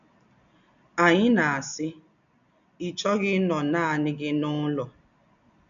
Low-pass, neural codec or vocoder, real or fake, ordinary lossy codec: 7.2 kHz; none; real; none